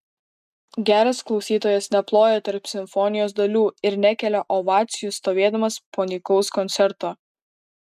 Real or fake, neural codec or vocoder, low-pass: real; none; 14.4 kHz